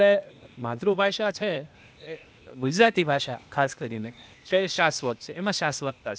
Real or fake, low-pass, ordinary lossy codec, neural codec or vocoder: fake; none; none; codec, 16 kHz, 0.8 kbps, ZipCodec